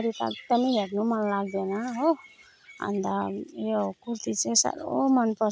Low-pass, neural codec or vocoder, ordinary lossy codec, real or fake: none; none; none; real